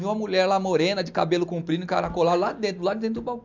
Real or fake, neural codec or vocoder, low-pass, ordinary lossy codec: real; none; 7.2 kHz; MP3, 64 kbps